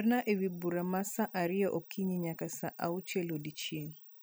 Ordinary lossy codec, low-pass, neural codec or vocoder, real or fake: none; none; none; real